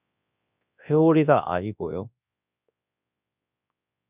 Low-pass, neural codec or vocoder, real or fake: 3.6 kHz; codec, 24 kHz, 0.9 kbps, WavTokenizer, large speech release; fake